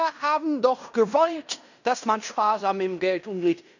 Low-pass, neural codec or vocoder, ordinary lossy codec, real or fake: 7.2 kHz; codec, 16 kHz in and 24 kHz out, 0.9 kbps, LongCat-Audio-Codec, fine tuned four codebook decoder; none; fake